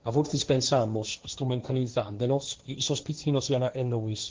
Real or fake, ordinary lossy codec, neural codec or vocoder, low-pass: fake; Opus, 16 kbps; codec, 16 kHz, 1.1 kbps, Voila-Tokenizer; 7.2 kHz